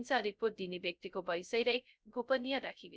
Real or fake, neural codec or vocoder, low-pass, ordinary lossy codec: fake; codec, 16 kHz, 0.2 kbps, FocalCodec; none; none